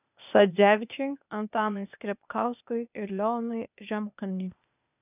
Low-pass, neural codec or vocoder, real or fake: 3.6 kHz; codec, 16 kHz, 0.8 kbps, ZipCodec; fake